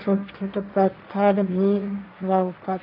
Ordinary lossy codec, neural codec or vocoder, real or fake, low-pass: none; codec, 16 kHz, 1.1 kbps, Voila-Tokenizer; fake; 5.4 kHz